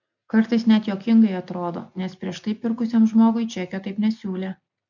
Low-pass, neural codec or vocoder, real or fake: 7.2 kHz; none; real